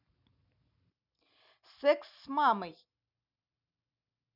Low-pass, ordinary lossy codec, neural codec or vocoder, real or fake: 5.4 kHz; none; none; real